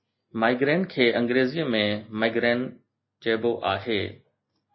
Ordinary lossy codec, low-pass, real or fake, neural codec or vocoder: MP3, 24 kbps; 7.2 kHz; real; none